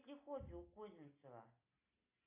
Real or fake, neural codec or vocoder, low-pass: real; none; 3.6 kHz